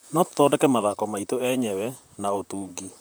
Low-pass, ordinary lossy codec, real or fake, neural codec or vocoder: none; none; fake; vocoder, 44.1 kHz, 128 mel bands, Pupu-Vocoder